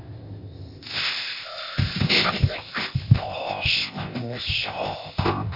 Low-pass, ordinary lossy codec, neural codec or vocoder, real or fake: 5.4 kHz; MP3, 32 kbps; codec, 16 kHz, 0.8 kbps, ZipCodec; fake